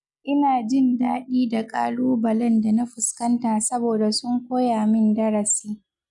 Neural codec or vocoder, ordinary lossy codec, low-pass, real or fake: none; none; 10.8 kHz; real